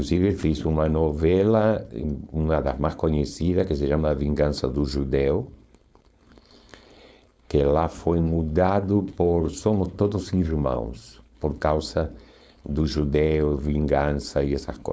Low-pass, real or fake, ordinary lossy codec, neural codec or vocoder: none; fake; none; codec, 16 kHz, 4.8 kbps, FACodec